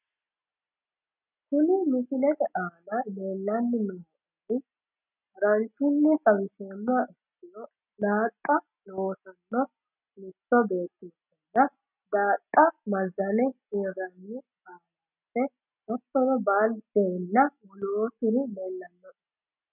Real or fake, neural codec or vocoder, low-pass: real; none; 3.6 kHz